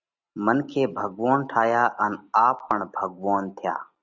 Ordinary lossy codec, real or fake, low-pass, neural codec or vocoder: Opus, 64 kbps; real; 7.2 kHz; none